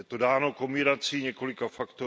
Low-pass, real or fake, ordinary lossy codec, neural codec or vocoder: none; real; none; none